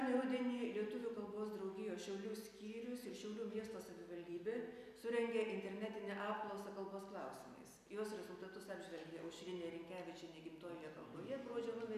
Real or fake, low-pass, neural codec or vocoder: real; 14.4 kHz; none